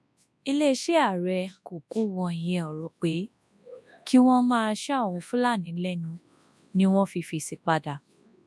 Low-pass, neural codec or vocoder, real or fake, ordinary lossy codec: none; codec, 24 kHz, 0.9 kbps, WavTokenizer, large speech release; fake; none